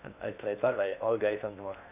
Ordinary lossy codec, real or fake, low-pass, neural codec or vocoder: none; fake; 3.6 kHz; codec, 16 kHz in and 24 kHz out, 0.6 kbps, FocalCodec, streaming, 2048 codes